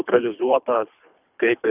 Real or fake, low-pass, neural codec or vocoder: fake; 3.6 kHz; codec, 24 kHz, 3 kbps, HILCodec